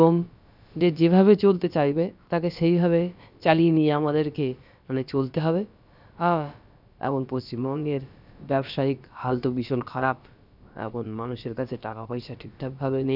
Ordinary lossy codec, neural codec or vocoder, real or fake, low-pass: none; codec, 16 kHz, about 1 kbps, DyCAST, with the encoder's durations; fake; 5.4 kHz